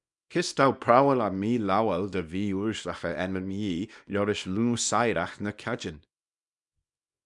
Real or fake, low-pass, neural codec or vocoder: fake; 10.8 kHz; codec, 24 kHz, 0.9 kbps, WavTokenizer, small release